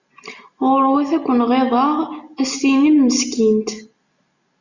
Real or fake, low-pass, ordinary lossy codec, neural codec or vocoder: real; 7.2 kHz; Opus, 64 kbps; none